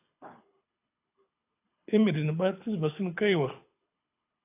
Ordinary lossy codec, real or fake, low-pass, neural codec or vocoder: AAC, 24 kbps; fake; 3.6 kHz; codec, 24 kHz, 6 kbps, HILCodec